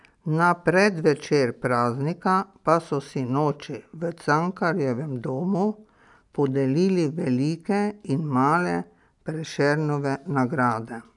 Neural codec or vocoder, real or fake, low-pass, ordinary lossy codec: none; real; 10.8 kHz; none